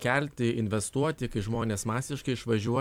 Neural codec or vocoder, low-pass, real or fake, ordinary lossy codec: vocoder, 44.1 kHz, 128 mel bands every 256 samples, BigVGAN v2; 19.8 kHz; fake; MP3, 96 kbps